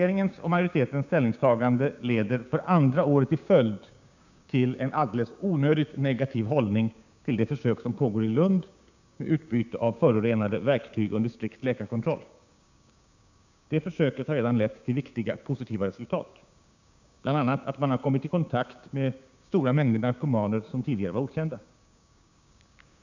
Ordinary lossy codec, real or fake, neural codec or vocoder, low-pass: none; fake; codec, 16 kHz, 6 kbps, DAC; 7.2 kHz